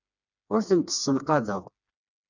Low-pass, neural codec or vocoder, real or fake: 7.2 kHz; codec, 16 kHz, 2 kbps, FreqCodec, smaller model; fake